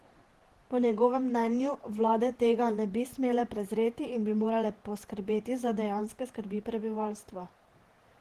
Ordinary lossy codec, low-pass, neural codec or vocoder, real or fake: Opus, 16 kbps; 14.4 kHz; vocoder, 48 kHz, 128 mel bands, Vocos; fake